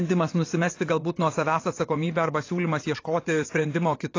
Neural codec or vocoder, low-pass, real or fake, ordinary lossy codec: vocoder, 22.05 kHz, 80 mel bands, WaveNeXt; 7.2 kHz; fake; AAC, 32 kbps